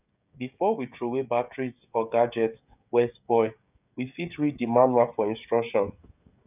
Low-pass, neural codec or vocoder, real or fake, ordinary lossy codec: 3.6 kHz; codec, 16 kHz, 16 kbps, FreqCodec, smaller model; fake; none